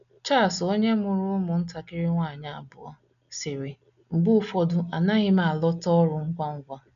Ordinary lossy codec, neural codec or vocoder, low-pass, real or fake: none; none; 7.2 kHz; real